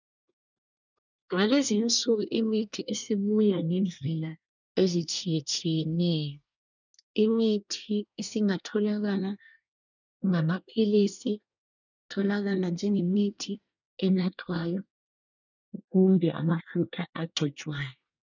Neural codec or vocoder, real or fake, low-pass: codec, 24 kHz, 1 kbps, SNAC; fake; 7.2 kHz